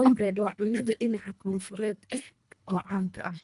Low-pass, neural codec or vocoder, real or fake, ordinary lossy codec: 10.8 kHz; codec, 24 kHz, 1.5 kbps, HILCodec; fake; none